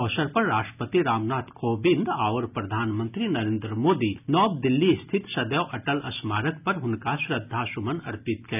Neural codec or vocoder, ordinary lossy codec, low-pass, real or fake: none; none; 3.6 kHz; real